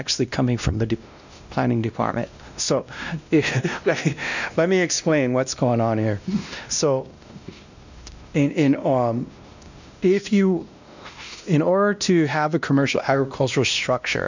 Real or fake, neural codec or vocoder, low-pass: fake; codec, 16 kHz, 1 kbps, X-Codec, WavLM features, trained on Multilingual LibriSpeech; 7.2 kHz